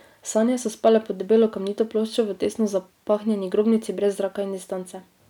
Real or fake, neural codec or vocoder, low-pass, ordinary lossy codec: real; none; 19.8 kHz; none